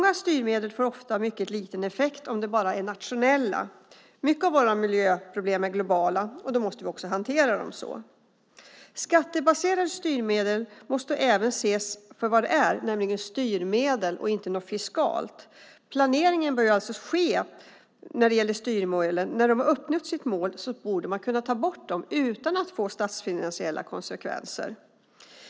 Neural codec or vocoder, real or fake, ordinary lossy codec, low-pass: none; real; none; none